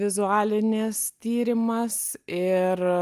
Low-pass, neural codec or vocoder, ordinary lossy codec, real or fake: 14.4 kHz; none; Opus, 32 kbps; real